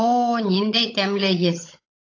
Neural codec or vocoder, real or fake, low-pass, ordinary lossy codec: codec, 16 kHz, 16 kbps, FunCodec, trained on LibriTTS, 50 frames a second; fake; 7.2 kHz; AAC, 48 kbps